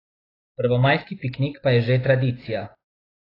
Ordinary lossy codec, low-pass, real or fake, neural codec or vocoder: AAC, 24 kbps; 5.4 kHz; real; none